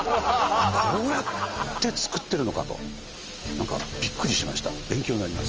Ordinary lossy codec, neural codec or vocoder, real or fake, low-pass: Opus, 24 kbps; none; real; 7.2 kHz